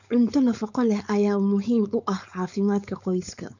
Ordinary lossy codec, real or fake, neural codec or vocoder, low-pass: none; fake; codec, 16 kHz, 4.8 kbps, FACodec; 7.2 kHz